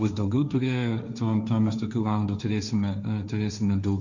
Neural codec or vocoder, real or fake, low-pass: codec, 16 kHz, 1.1 kbps, Voila-Tokenizer; fake; 7.2 kHz